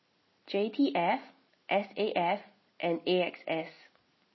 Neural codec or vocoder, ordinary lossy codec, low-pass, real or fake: none; MP3, 24 kbps; 7.2 kHz; real